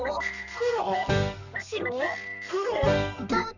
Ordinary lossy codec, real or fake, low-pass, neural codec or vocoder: none; fake; 7.2 kHz; codec, 32 kHz, 1.9 kbps, SNAC